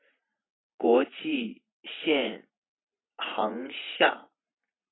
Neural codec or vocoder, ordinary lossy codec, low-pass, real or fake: vocoder, 44.1 kHz, 80 mel bands, Vocos; AAC, 16 kbps; 7.2 kHz; fake